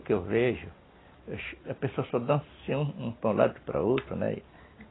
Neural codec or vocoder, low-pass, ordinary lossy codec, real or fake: none; 7.2 kHz; AAC, 16 kbps; real